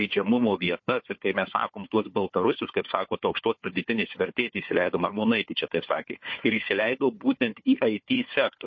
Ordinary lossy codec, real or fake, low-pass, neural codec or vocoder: MP3, 32 kbps; fake; 7.2 kHz; codec, 16 kHz, 4 kbps, FunCodec, trained on Chinese and English, 50 frames a second